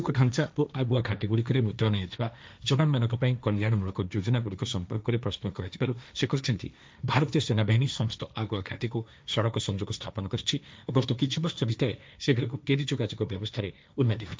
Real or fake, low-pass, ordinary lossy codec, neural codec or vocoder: fake; none; none; codec, 16 kHz, 1.1 kbps, Voila-Tokenizer